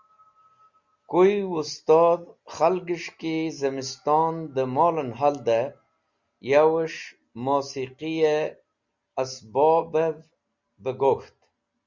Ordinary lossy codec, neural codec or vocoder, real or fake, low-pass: Opus, 64 kbps; none; real; 7.2 kHz